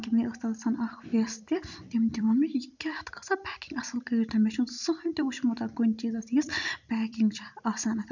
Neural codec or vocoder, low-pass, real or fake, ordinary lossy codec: vocoder, 44.1 kHz, 128 mel bands every 256 samples, BigVGAN v2; 7.2 kHz; fake; none